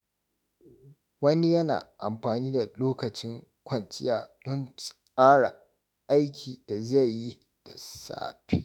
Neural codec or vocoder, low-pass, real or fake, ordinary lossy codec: autoencoder, 48 kHz, 32 numbers a frame, DAC-VAE, trained on Japanese speech; none; fake; none